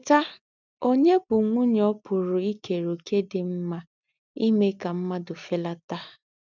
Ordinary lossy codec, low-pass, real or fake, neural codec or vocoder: none; 7.2 kHz; real; none